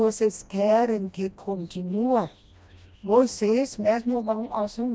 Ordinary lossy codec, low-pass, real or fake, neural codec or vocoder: none; none; fake; codec, 16 kHz, 1 kbps, FreqCodec, smaller model